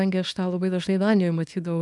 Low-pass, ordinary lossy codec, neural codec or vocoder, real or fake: 10.8 kHz; MP3, 96 kbps; codec, 24 kHz, 0.9 kbps, WavTokenizer, small release; fake